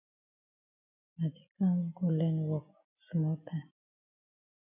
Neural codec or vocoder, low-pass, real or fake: none; 3.6 kHz; real